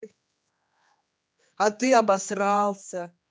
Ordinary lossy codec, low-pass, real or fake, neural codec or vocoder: none; none; fake; codec, 16 kHz, 2 kbps, X-Codec, HuBERT features, trained on general audio